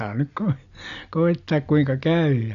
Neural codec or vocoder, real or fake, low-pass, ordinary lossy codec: none; real; 7.2 kHz; none